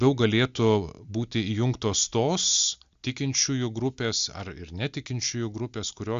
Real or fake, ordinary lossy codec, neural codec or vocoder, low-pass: real; Opus, 64 kbps; none; 7.2 kHz